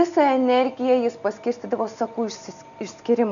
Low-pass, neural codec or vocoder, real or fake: 7.2 kHz; none; real